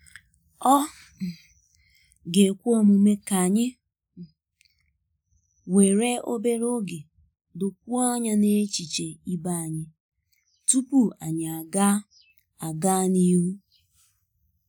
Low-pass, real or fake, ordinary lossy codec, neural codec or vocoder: none; real; none; none